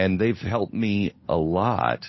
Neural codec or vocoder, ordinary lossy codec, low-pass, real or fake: codec, 16 kHz, 8 kbps, FunCodec, trained on Chinese and English, 25 frames a second; MP3, 24 kbps; 7.2 kHz; fake